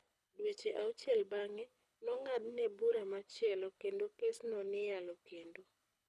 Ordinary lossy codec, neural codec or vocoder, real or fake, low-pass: none; codec, 24 kHz, 6 kbps, HILCodec; fake; none